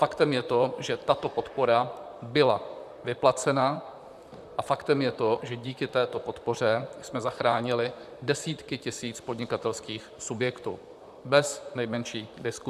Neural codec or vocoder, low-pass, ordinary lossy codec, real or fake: vocoder, 44.1 kHz, 128 mel bands, Pupu-Vocoder; 14.4 kHz; AAC, 96 kbps; fake